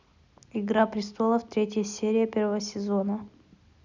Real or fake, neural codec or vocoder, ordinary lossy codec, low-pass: real; none; none; 7.2 kHz